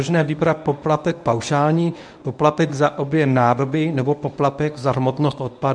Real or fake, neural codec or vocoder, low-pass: fake; codec, 24 kHz, 0.9 kbps, WavTokenizer, medium speech release version 1; 9.9 kHz